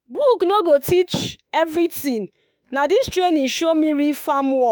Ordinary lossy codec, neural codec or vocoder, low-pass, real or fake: none; autoencoder, 48 kHz, 32 numbers a frame, DAC-VAE, trained on Japanese speech; none; fake